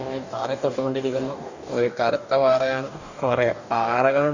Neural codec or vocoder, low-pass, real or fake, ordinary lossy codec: codec, 44.1 kHz, 2.6 kbps, DAC; 7.2 kHz; fake; none